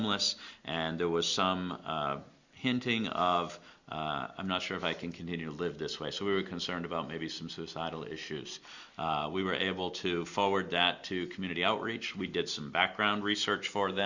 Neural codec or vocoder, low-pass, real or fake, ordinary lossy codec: none; 7.2 kHz; real; Opus, 64 kbps